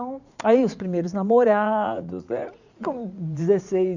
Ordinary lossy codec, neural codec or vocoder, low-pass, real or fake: none; vocoder, 44.1 kHz, 80 mel bands, Vocos; 7.2 kHz; fake